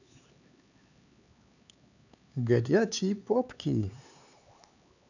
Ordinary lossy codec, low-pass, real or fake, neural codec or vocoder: none; 7.2 kHz; fake; codec, 16 kHz, 4 kbps, X-Codec, HuBERT features, trained on LibriSpeech